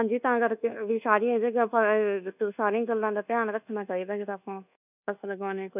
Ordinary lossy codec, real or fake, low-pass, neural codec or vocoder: none; fake; 3.6 kHz; codec, 24 kHz, 1.2 kbps, DualCodec